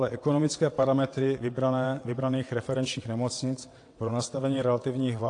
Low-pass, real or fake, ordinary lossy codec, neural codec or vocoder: 9.9 kHz; fake; AAC, 48 kbps; vocoder, 22.05 kHz, 80 mel bands, WaveNeXt